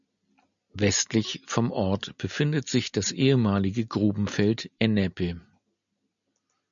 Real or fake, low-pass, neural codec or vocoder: real; 7.2 kHz; none